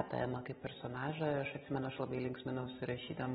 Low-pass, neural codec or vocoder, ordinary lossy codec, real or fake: 7.2 kHz; none; AAC, 16 kbps; real